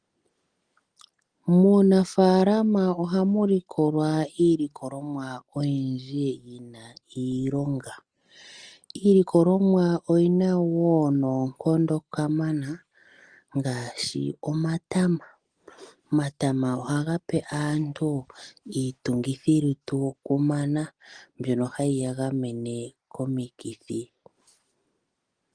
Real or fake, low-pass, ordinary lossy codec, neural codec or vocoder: real; 9.9 kHz; Opus, 24 kbps; none